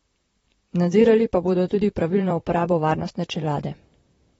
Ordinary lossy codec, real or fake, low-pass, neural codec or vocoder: AAC, 24 kbps; fake; 19.8 kHz; vocoder, 44.1 kHz, 128 mel bands, Pupu-Vocoder